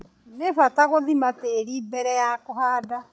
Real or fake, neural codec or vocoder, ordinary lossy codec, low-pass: fake; codec, 16 kHz, 16 kbps, FreqCodec, larger model; none; none